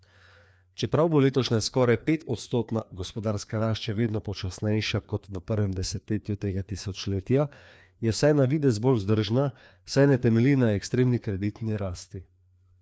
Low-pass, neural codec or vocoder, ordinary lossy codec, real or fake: none; codec, 16 kHz, 2 kbps, FreqCodec, larger model; none; fake